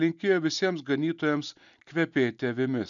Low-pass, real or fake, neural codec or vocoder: 7.2 kHz; real; none